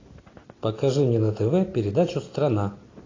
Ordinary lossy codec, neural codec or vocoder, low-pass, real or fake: AAC, 32 kbps; none; 7.2 kHz; real